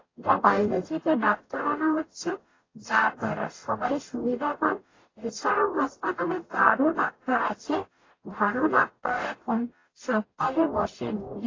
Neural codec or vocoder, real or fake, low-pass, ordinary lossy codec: codec, 44.1 kHz, 0.9 kbps, DAC; fake; 7.2 kHz; AAC, 32 kbps